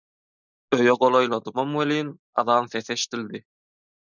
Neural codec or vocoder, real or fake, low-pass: none; real; 7.2 kHz